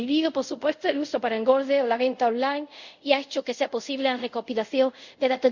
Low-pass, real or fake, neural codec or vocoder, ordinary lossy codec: 7.2 kHz; fake; codec, 24 kHz, 0.5 kbps, DualCodec; none